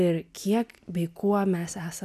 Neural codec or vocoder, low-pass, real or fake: none; 14.4 kHz; real